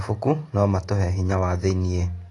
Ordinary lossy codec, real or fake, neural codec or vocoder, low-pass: AAC, 32 kbps; real; none; 10.8 kHz